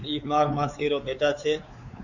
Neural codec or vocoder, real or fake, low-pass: codec, 16 kHz, 4 kbps, X-Codec, WavLM features, trained on Multilingual LibriSpeech; fake; 7.2 kHz